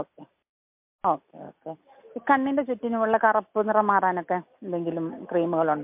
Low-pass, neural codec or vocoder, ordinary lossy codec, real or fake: 3.6 kHz; none; MP3, 32 kbps; real